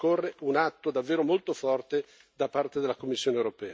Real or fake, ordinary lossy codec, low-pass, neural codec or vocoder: real; none; none; none